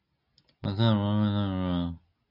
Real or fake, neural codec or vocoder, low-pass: real; none; 5.4 kHz